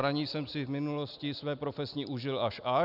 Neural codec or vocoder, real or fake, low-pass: none; real; 5.4 kHz